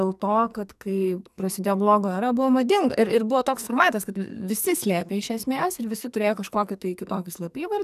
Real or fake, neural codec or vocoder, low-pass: fake; codec, 44.1 kHz, 2.6 kbps, SNAC; 14.4 kHz